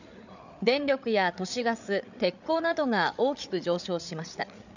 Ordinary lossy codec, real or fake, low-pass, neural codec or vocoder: none; fake; 7.2 kHz; codec, 16 kHz, 8 kbps, FreqCodec, larger model